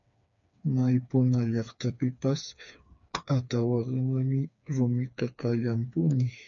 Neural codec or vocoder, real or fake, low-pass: codec, 16 kHz, 4 kbps, FreqCodec, smaller model; fake; 7.2 kHz